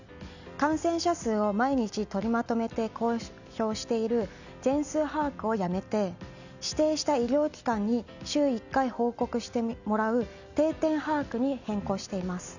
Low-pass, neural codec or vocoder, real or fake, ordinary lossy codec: 7.2 kHz; none; real; none